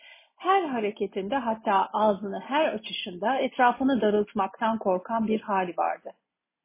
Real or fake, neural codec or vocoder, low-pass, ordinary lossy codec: real; none; 3.6 kHz; MP3, 16 kbps